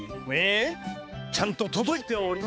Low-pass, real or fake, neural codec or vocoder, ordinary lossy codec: none; fake; codec, 16 kHz, 4 kbps, X-Codec, HuBERT features, trained on balanced general audio; none